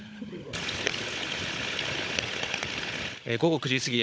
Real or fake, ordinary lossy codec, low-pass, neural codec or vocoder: fake; none; none; codec, 16 kHz, 16 kbps, FunCodec, trained on Chinese and English, 50 frames a second